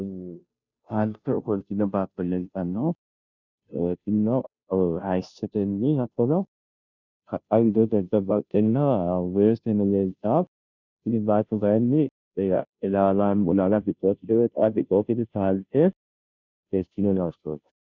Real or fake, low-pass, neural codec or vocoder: fake; 7.2 kHz; codec, 16 kHz, 0.5 kbps, FunCodec, trained on Chinese and English, 25 frames a second